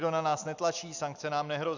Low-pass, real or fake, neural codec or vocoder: 7.2 kHz; real; none